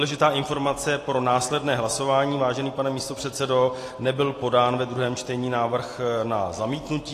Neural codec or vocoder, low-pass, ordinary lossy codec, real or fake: none; 14.4 kHz; AAC, 48 kbps; real